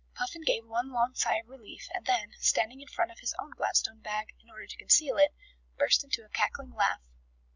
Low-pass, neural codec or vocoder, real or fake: 7.2 kHz; none; real